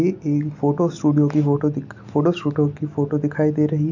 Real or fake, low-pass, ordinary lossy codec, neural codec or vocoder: real; 7.2 kHz; none; none